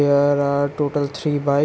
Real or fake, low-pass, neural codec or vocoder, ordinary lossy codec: real; none; none; none